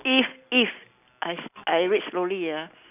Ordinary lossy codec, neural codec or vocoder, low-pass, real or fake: none; none; 3.6 kHz; real